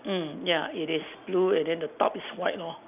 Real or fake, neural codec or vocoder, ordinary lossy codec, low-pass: real; none; none; 3.6 kHz